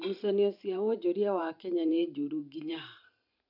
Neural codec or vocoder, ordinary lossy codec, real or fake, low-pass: vocoder, 44.1 kHz, 128 mel bands every 512 samples, BigVGAN v2; none; fake; 5.4 kHz